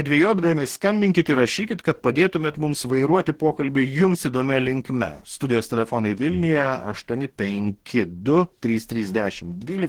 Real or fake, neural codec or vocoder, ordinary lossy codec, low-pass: fake; codec, 44.1 kHz, 2.6 kbps, DAC; Opus, 16 kbps; 19.8 kHz